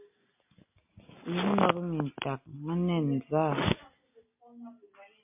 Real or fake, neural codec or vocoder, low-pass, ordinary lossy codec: real; none; 3.6 kHz; MP3, 32 kbps